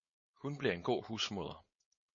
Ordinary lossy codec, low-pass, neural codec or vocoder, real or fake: MP3, 32 kbps; 7.2 kHz; codec, 16 kHz, 4.8 kbps, FACodec; fake